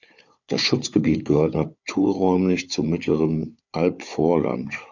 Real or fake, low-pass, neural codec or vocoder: fake; 7.2 kHz; codec, 16 kHz, 4 kbps, FunCodec, trained on Chinese and English, 50 frames a second